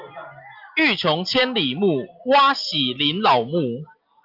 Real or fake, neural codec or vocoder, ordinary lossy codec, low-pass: real; none; Opus, 32 kbps; 5.4 kHz